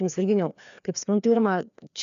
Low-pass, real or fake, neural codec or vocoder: 7.2 kHz; fake; codec, 16 kHz, 2 kbps, FreqCodec, larger model